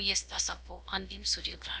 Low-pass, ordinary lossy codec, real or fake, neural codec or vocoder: none; none; fake; codec, 16 kHz, about 1 kbps, DyCAST, with the encoder's durations